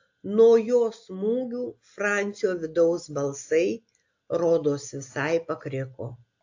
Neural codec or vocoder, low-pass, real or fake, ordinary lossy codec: none; 7.2 kHz; real; AAC, 48 kbps